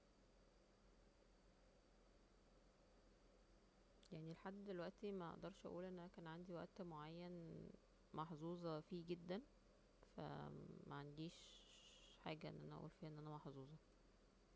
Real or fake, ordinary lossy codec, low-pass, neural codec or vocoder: real; none; none; none